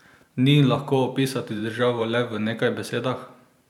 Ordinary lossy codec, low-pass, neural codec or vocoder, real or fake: none; 19.8 kHz; vocoder, 44.1 kHz, 128 mel bands every 512 samples, BigVGAN v2; fake